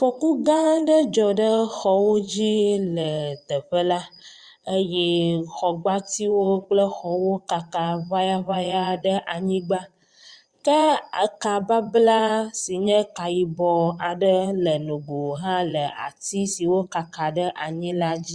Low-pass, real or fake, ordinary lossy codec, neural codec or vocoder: 9.9 kHz; fake; Opus, 64 kbps; vocoder, 22.05 kHz, 80 mel bands, Vocos